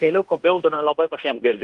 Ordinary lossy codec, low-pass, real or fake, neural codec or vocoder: Opus, 32 kbps; 10.8 kHz; fake; codec, 16 kHz in and 24 kHz out, 0.9 kbps, LongCat-Audio-Codec, fine tuned four codebook decoder